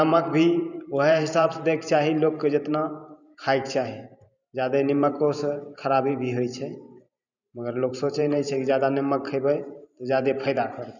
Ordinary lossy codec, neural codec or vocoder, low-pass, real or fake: none; vocoder, 44.1 kHz, 128 mel bands every 512 samples, BigVGAN v2; 7.2 kHz; fake